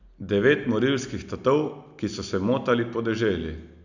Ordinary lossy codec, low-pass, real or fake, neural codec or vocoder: none; 7.2 kHz; real; none